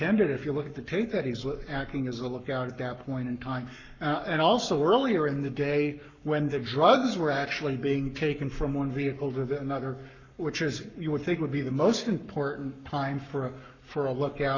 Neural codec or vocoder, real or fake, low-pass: codec, 44.1 kHz, 7.8 kbps, Pupu-Codec; fake; 7.2 kHz